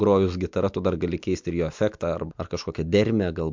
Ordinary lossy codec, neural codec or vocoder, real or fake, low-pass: MP3, 64 kbps; none; real; 7.2 kHz